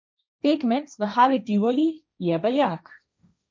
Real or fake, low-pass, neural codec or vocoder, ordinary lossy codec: fake; none; codec, 16 kHz, 1.1 kbps, Voila-Tokenizer; none